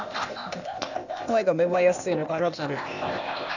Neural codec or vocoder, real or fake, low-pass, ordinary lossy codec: codec, 16 kHz, 0.8 kbps, ZipCodec; fake; 7.2 kHz; none